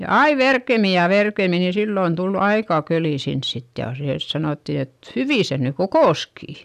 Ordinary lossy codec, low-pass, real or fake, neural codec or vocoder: none; 14.4 kHz; real; none